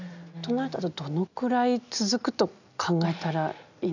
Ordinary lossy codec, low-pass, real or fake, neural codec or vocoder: none; 7.2 kHz; real; none